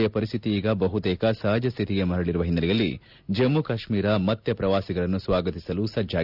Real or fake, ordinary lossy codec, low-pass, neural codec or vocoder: real; none; 5.4 kHz; none